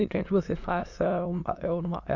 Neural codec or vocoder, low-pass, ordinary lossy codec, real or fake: autoencoder, 22.05 kHz, a latent of 192 numbers a frame, VITS, trained on many speakers; 7.2 kHz; Opus, 64 kbps; fake